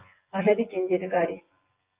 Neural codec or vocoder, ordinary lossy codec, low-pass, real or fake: vocoder, 24 kHz, 100 mel bands, Vocos; Opus, 32 kbps; 3.6 kHz; fake